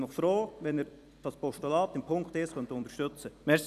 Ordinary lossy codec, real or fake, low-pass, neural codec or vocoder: none; fake; 14.4 kHz; vocoder, 44.1 kHz, 128 mel bands every 256 samples, BigVGAN v2